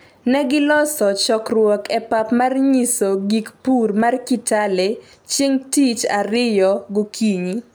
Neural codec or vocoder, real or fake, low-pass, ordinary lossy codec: none; real; none; none